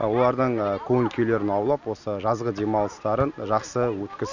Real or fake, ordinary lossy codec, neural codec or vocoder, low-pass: real; none; none; 7.2 kHz